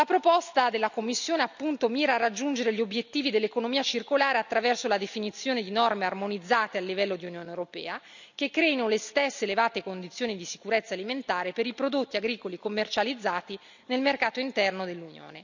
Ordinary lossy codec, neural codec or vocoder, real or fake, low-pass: none; none; real; 7.2 kHz